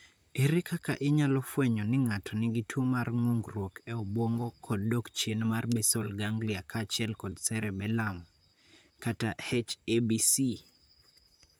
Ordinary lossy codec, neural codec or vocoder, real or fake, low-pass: none; vocoder, 44.1 kHz, 128 mel bands, Pupu-Vocoder; fake; none